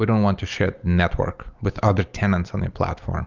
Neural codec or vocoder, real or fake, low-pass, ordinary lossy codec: none; real; 7.2 kHz; Opus, 24 kbps